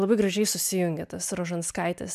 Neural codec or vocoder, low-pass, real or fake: none; 14.4 kHz; real